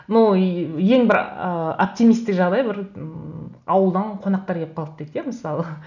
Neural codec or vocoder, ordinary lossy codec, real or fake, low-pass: none; none; real; 7.2 kHz